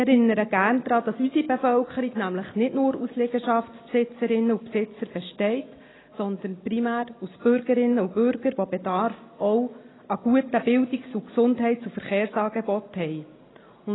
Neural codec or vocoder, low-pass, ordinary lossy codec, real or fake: none; 7.2 kHz; AAC, 16 kbps; real